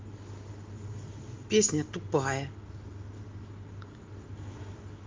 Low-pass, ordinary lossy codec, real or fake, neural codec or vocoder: 7.2 kHz; Opus, 32 kbps; real; none